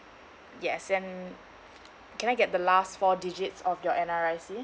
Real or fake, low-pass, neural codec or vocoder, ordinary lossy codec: real; none; none; none